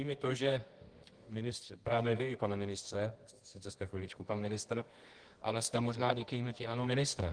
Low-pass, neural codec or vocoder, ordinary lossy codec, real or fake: 9.9 kHz; codec, 24 kHz, 0.9 kbps, WavTokenizer, medium music audio release; Opus, 24 kbps; fake